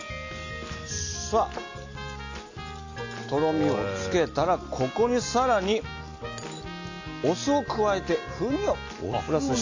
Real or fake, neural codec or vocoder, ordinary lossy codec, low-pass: real; none; AAC, 48 kbps; 7.2 kHz